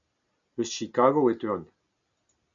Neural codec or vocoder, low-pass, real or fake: none; 7.2 kHz; real